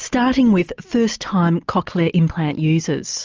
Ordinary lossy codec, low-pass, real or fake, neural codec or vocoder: Opus, 24 kbps; 7.2 kHz; real; none